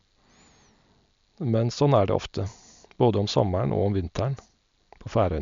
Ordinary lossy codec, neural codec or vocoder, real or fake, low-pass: MP3, 64 kbps; none; real; 7.2 kHz